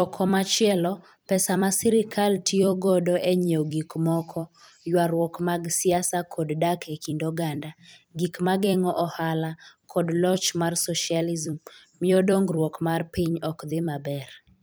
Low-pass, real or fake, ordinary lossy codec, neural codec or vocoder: none; fake; none; vocoder, 44.1 kHz, 128 mel bands every 256 samples, BigVGAN v2